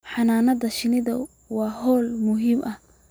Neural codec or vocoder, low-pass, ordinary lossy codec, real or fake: none; none; none; real